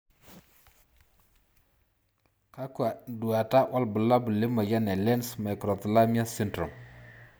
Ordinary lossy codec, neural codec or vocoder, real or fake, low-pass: none; none; real; none